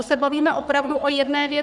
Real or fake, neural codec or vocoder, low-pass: fake; codec, 44.1 kHz, 3.4 kbps, Pupu-Codec; 10.8 kHz